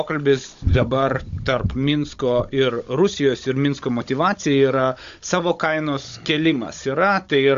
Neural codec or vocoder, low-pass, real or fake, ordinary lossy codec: codec, 16 kHz, 16 kbps, FunCodec, trained on Chinese and English, 50 frames a second; 7.2 kHz; fake; AAC, 64 kbps